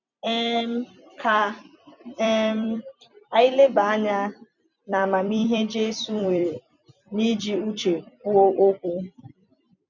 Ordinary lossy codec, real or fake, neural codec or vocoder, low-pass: none; fake; vocoder, 44.1 kHz, 128 mel bands every 256 samples, BigVGAN v2; 7.2 kHz